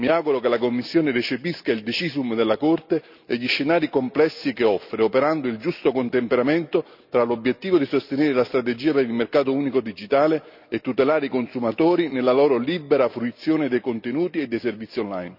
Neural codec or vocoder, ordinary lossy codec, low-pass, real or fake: none; none; 5.4 kHz; real